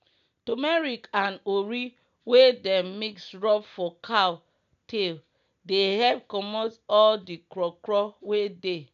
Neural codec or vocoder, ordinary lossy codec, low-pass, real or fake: none; none; 7.2 kHz; real